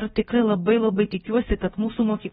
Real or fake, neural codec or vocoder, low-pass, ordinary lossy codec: fake; codec, 16 kHz, about 1 kbps, DyCAST, with the encoder's durations; 7.2 kHz; AAC, 16 kbps